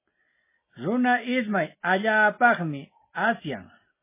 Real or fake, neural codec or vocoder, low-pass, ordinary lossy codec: real; none; 3.6 kHz; MP3, 16 kbps